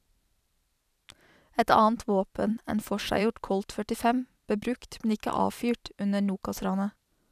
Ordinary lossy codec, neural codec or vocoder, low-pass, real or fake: none; vocoder, 44.1 kHz, 128 mel bands every 512 samples, BigVGAN v2; 14.4 kHz; fake